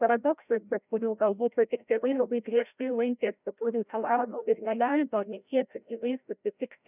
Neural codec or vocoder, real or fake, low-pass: codec, 16 kHz, 0.5 kbps, FreqCodec, larger model; fake; 3.6 kHz